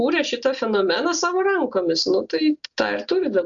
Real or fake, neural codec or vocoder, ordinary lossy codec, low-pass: real; none; MP3, 64 kbps; 7.2 kHz